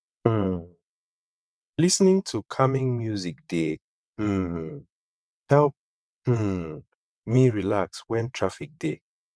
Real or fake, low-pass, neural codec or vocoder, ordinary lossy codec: fake; none; vocoder, 22.05 kHz, 80 mel bands, WaveNeXt; none